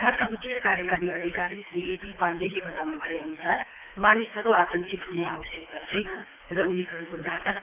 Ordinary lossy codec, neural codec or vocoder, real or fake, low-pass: none; codec, 24 kHz, 3 kbps, HILCodec; fake; 3.6 kHz